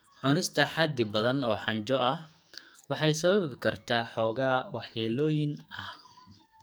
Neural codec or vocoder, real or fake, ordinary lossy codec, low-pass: codec, 44.1 kHz, 2.6 kbps, SNAC; fake; none; none